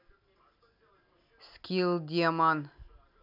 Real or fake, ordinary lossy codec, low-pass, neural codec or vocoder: real; none; 5.4 kHz; none